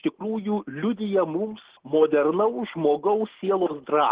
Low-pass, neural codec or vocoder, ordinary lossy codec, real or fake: 3.6 kHz; none; Opus, 16 kbps; real